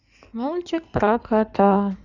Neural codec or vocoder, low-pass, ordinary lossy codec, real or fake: codec, 16 kHz in and 24 kHz out, 2.2 kbps, FireRedTTS-2 codec; 7.2 kHz; Opus, 64 kbps; fake